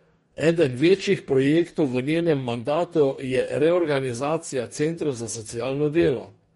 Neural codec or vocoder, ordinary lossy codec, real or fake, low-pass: codec, 44.1 kHz, 2.6 kbps, DAC; MP3, 48 kbps; fake; 19.8 kHz